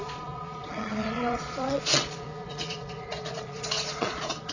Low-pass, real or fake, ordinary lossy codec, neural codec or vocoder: 7.2 kHz; fake; none; vocoder, 22.05 kHz, 80 mel bands, Vocos